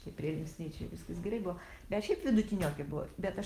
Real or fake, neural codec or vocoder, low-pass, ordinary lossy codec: real; none; 14.4 kHz; Opus, 24 kbps